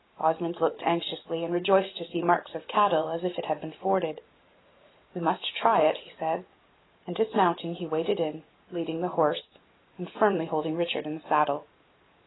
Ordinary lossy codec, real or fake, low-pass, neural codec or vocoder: AAC, 16 kbps; fake; 7.2 kHz; vocoder, 44.1 kHz, 128 mel bands every 512 samples, BigVGAN v2